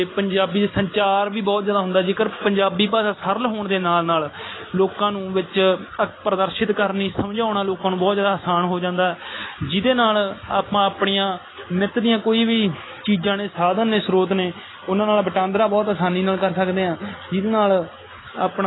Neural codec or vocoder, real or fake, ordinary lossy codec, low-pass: none; real; AAC, 16 kbps; 7.2 kHz